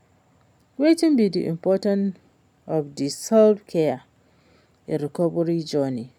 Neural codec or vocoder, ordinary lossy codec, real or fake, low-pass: none; none; real; 19.8 kHz